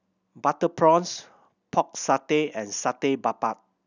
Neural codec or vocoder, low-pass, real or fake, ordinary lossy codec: none; 7.2 kHz; real; none